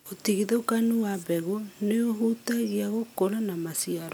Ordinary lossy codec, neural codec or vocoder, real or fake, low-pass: none; none; real; none